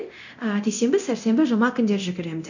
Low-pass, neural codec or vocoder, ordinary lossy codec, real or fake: 7.2 kHz; codec, 24 kHz, 0.9 kbps, DualCodec; none; fake